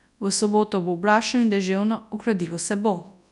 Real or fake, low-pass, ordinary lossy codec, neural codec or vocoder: fake; 10.8 kHz; none; codec, 24 kHz, 0.9 kbps, WavTokenizer, large speech release